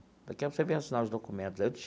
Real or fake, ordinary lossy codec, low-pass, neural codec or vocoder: real; none; none; none